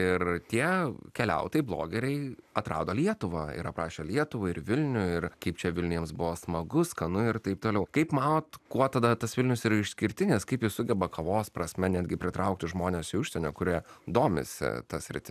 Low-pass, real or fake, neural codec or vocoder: 14.4 kHz; real; none